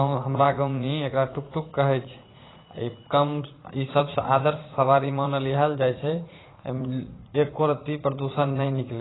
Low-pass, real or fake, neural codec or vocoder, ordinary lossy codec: 7.2 kHz; fake; vocoder, 44.1 kHz, 80 mel bands, Vocos; AAC, 16 kbps